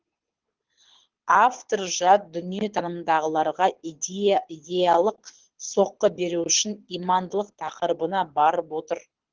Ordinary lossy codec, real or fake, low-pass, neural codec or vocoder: Opus, 16 kbps; fake; 7.2 kHz; codec, 24 kHz, 6 kbps, HILCodec